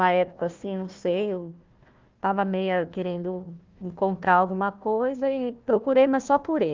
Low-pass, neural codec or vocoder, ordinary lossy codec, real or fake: 7.2 kHz; codec, 16 kHz, 1 kbps, FunCodec, trained on Chinese and English, 50 frames a second; Opus, 32 kbps; fake